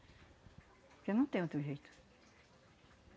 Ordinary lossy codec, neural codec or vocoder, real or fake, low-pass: none; none; real; none